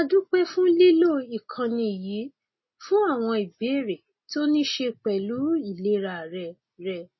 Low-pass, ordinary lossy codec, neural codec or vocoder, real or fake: 7.2 kHz; MP3, 24 kbps; none; real